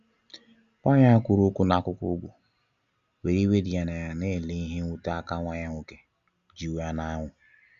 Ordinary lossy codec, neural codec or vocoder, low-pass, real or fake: Opus, 64 kbps; none; 7.2 kHz; real